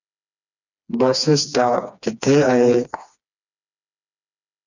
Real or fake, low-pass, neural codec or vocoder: fake; 7.2 kHz; codec, 16 kHz, 2 kbps, FreqCodec, smaller model